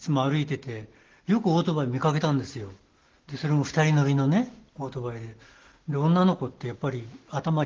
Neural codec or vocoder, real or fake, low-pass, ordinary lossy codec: none; real; 7.2 kHz; Opus, 16 kbps